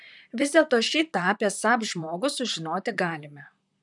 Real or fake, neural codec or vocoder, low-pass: fake; vocoder, 44.1 kHz, 128 mel bands, Pupu-Vocoder; 10.8 kHz